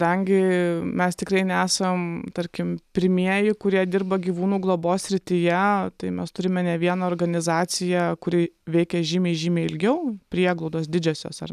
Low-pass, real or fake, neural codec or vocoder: 14.4 kHz; real; none